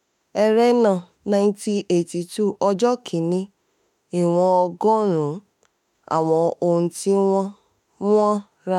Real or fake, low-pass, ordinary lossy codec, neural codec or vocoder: fake; 19.8 kHz; MP3, 96 kbps; autoencoder, 48 kHz, 32 numbers a frame, DAC-VAE, trained on Japanese speech